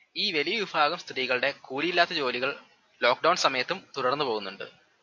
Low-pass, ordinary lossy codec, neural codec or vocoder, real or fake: 7.2 kHz; AAC, 48 kbps; none; real